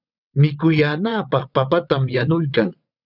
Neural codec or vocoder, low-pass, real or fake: vocoder, 22.05 kHz, 80 mel bands, WaveNeXt; 5.4 kHz; fake